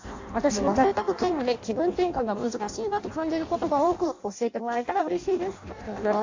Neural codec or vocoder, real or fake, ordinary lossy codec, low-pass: codec, 16 kHz in and 24 kHz out, 0.6 kbps, FireRedTTS-2 codec; fake; AAC, 48 kbps; 7.2 kHz